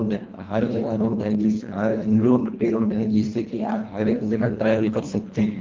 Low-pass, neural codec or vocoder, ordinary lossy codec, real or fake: 7.2 kHz; codec, 24 kHz, 1.5 kbps, HILCodec; Opus, 32 kbps; fake